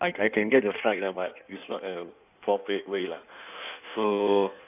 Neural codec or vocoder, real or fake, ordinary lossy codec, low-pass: codec, 16 kHz in and 24 kHz out, 1.1 kbps, FireRedTTS-2 codec; fake; none; 3.6 kHz